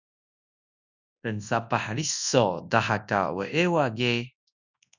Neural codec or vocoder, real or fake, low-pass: codec, 24 kHz, 0.9 kbps, WavTokenizer, large speech release; fake; 7.2 kHz